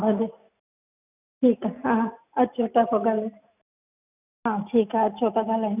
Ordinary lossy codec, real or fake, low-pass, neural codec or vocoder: none; real; 3.6 kHz; none